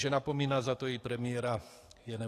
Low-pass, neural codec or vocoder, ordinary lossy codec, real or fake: 14.4 kHz; codec, 44.1 kHz, 7.8 kbps, DAC; AAC, 48 kbps; fake